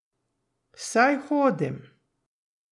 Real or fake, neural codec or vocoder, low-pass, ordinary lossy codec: real; none; 10.8 kHz; none